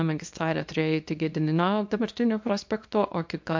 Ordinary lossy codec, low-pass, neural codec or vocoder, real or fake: MP3, 48 kbps; 7.2 kHz; codec, 24 kHz, 0.9 kbps, WavTokenizer, small release; fake